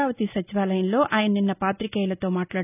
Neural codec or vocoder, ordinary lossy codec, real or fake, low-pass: none; none; real; 3.6 kHz